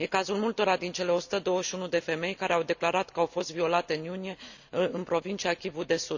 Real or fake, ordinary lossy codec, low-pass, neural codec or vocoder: real; none; 7.2 kHz; none